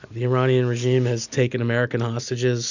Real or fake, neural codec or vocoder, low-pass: real; none; 7.2 kHz